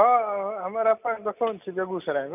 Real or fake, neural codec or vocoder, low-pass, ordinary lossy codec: real; none; 3.6 kHz; none